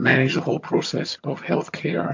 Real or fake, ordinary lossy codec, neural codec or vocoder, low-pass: fake; MP3, 48 kbps; vocoder, 22.05 kHz, 80 mel bands, HiFi-GAN; 7.2 kHz